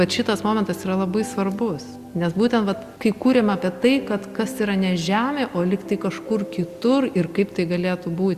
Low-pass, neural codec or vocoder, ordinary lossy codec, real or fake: 14.4 kHz; none; Opus, 64 kbps; real